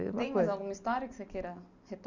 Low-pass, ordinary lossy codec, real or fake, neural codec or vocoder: 7.2 kHz; none; real; none